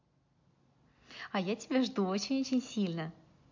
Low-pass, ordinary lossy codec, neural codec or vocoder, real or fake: 7.2 kHz; MP3, 48 kbps; none; real